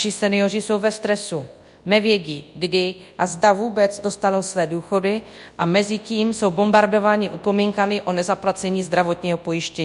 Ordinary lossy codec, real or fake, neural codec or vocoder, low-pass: MP3, 64 kbps; fake; codec, 24 kHz, 0.9 kbps, WavTokenizer, large speech release; 10.8 kHz